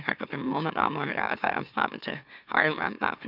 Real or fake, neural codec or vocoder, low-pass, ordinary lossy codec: fake; autoencoder, 44.1 kHz, a latent of 192 numbers a frame, MeloTTS; 5.4 kHz; none